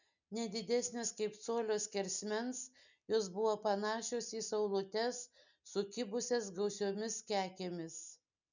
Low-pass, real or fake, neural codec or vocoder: 7.2 kHz; real; none